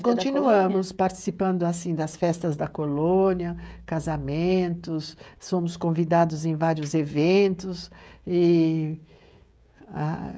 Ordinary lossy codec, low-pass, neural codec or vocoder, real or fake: none; none; codec, 16 kHz, 16 kbps, FreqCodec, smaller model; fake